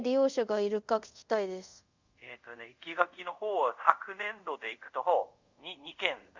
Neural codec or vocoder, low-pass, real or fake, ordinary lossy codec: codec, 24 kHz, 0.5 kbps, DualCodec; 7.2 kHz; fake; Opus, 64 kbps